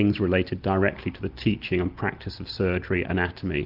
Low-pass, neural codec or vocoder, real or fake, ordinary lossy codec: 5.4 kHz; none; real; Opus, 32 kbps